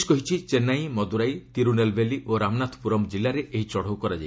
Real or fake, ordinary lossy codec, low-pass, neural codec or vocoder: real; none; none; none